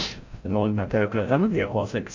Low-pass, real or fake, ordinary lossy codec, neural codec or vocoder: 7.2 kHz; fake; AAC, 48 kbps; codec, 16 kHz, 0.5 kbps, FreqCodec, larger model